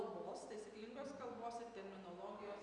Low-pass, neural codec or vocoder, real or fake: 9.9 kHz; none; real